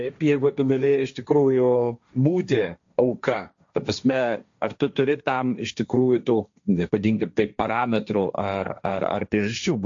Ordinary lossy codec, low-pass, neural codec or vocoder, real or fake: AAC, 64 kbps; 7.2 kHz; codec, 16 kHz, 1.1 kbps, Voila-Tokenizer; fake